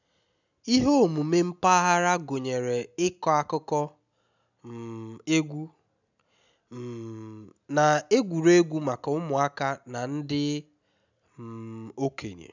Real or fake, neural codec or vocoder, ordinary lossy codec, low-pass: real; none; none; 7.2 kHz